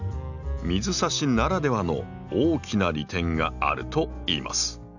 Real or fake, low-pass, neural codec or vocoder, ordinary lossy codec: real; 7.2 kHz; none; none